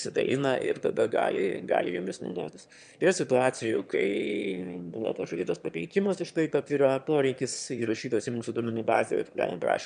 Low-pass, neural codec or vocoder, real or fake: 9.9 kHz; autoencoder, 22.05 kHz, a latent of 192 numbers a frame, VITS, trained on one speaker; fake